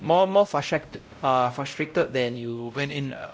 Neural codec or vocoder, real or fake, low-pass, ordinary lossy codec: codec, 16 kHz, 0.5 kbps, X-Codec, WavLM features, trained on Multilingual LibriSpeech; fake; none; none